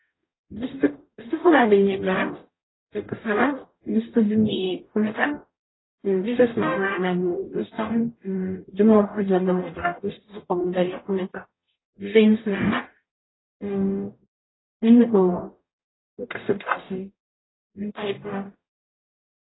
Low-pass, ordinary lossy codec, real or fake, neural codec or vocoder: 7.2 kHz; AAC, 16 kbps; fake; codec, 44.1 kHz, 0.9 kbps, DAC